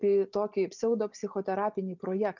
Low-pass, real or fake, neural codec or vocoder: 7.2 kHz; real; none